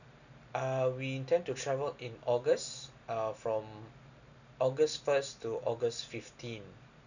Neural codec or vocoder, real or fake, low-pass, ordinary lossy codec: none; real; 7.2 kHz; none